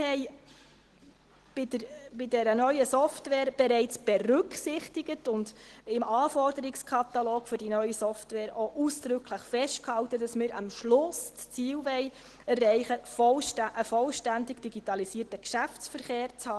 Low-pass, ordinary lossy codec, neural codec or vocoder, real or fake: 10.8 kHz; Opus, 16 kbps; none; real